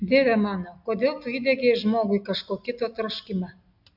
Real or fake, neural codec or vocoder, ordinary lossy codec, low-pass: real; none; AAC, 48 kbps; 5.4 kHz